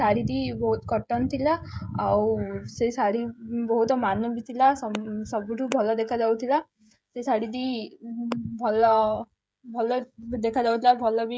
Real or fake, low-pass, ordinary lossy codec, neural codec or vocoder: fake; none; none; codec, 16 kHz, 16 kbps, FreqCodec, smaller model